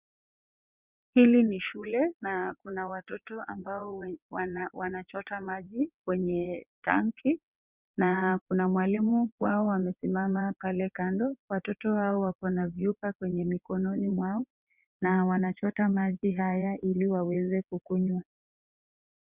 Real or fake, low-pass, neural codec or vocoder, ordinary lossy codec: fake; 3.6 kHz; vocoder, 22.05 kHz, 80 mel bands, WaveNeXt; Opus, 64 kbps